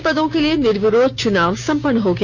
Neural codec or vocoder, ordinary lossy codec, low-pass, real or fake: codec, 16 kHz, 6 kbps, DAC; none; 7.2 kHz; fake